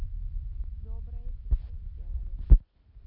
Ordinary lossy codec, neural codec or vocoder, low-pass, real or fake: none; none; 5.4 kHz; real